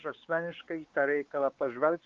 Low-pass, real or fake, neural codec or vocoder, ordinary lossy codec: 7.2 kHz; fake; codec, 16 kHz, 4 kbps, X-Codec, WavLM features, trained on Multilingual LibriSpeech; Opus, 16 kbps